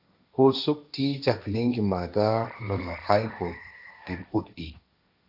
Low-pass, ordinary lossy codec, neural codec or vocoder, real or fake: 5.4 kHz; AAC, 48 kbps; codec, 16 kHz, 1.1 kbps, Voila-Tokenizer; fake